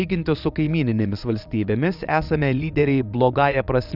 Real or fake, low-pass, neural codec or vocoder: fake; 5.4 kHz; vocoder, 22.05 kHz, 80 mel bands, Vocos